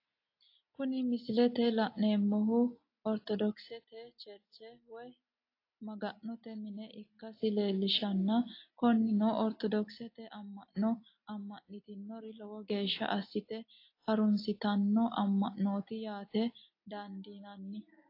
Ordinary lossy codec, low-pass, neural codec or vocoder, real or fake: AAC, 32 kbps; 5.4 kHz; none; real